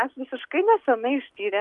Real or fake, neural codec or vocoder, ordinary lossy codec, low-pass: real; none; Opus, 32 kbps; 10.8 kHz